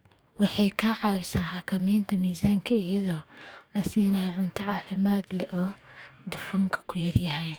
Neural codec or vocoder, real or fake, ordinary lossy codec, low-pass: codec, 44.1 kHz, 2.6 kbps, DAC; fake; none; none